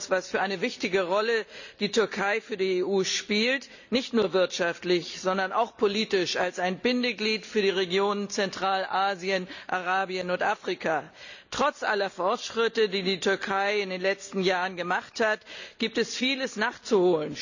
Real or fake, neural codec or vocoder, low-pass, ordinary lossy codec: real; none; 7.2 kHz; none